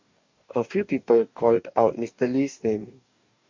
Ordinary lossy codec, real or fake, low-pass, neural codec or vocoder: MP3, 64 kbps; fake; 7.2 kHz; codec, 44.1 kHz, 2.6 kbps, DAC